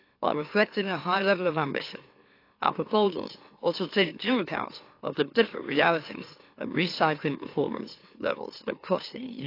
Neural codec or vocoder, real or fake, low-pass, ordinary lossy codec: autoencoder, 44.1 kHz, a latent of 192 numbers a frame, MeloTTS; fake; 5.4 kHz; AAC, 32 kbps